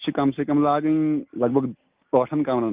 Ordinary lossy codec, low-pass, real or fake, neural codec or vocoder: Opus, 32 kbps; 3.6 kHz; real; none